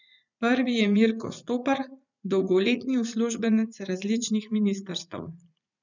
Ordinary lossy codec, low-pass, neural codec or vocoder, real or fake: none; 7.2 kHz; vocoder, 44.1 kHz, 80 mel bands, Vocos; fake